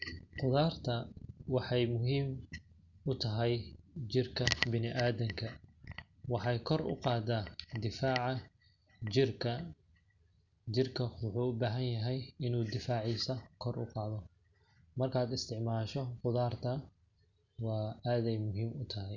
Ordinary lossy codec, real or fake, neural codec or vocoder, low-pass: Opus, 64 kbps; real; none; 7.2 kHz